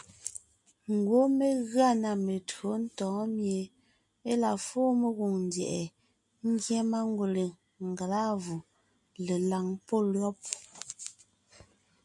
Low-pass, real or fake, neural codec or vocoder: 10.8 kHz; real; none